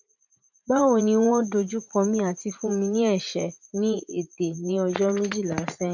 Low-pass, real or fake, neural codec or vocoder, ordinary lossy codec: 7.2 kHz; fake; vocoder, 44.1 kHz, 80 mel bands, Vocos; none